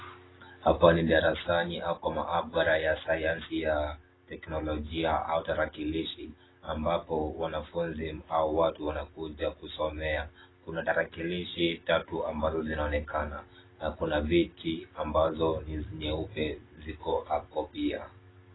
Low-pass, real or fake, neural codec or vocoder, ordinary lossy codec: 7.2 kHz; real; none; AAC, 16 kbps